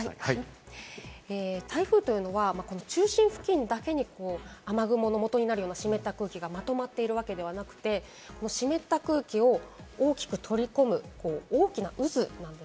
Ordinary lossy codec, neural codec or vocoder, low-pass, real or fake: none; none; none; real